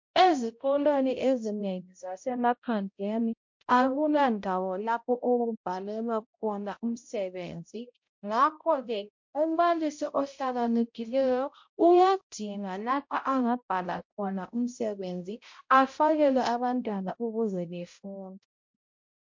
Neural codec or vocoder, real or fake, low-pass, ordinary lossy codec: codec, 16 kHz, 0.5 kbps, X-Codec, HuBERT features, trained on balanced general audio; fake; 7.2 kHz; MP3, 48 kbps